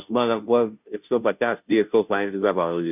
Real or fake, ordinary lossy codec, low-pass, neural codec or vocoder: fake; none; 3.6 kHz; codec, 16 kHz, 0.5 kbps, FunCodec, trained on Chinese and English, 25 frames a second